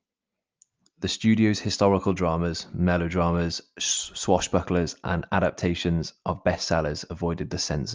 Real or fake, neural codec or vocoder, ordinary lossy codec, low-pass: real; none; Opus, 24 kbps; 7.2 kHz